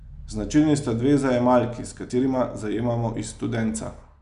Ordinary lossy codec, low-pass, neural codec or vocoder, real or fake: none; 10.8 kHz; none; real